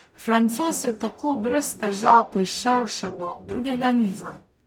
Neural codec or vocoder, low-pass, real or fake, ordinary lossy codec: codec, 44.1 kHz, 0.9 kbps, DAC; 19.8 kHz; fake; none